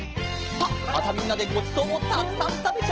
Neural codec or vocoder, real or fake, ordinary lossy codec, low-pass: none; real; Opus, 16 kbps; 7.2 kHz